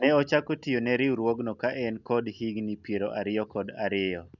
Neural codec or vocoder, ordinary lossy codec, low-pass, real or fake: none; none; 7.2 kHz; real